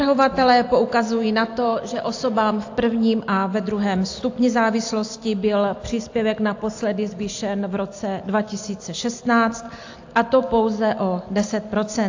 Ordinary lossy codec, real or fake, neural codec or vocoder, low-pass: AAC, 48 kbps; real; none; 7.2 kHz